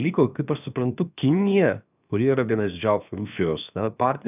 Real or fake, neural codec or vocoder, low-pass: fake; codec, 16 kHz, 0.7 kbps, FocalCodec; 3.6 kHz